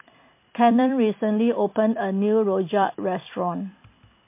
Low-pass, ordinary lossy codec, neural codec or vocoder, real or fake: 3.6 kHz; MP3, 24 kbps; vocoder, 44.1 kHz, 128 mel bands every 256 samples, BigVGAN v2; fake